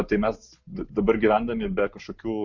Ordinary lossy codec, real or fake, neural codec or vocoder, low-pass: MP3, 48 kbps; real; none; 7.2 kHz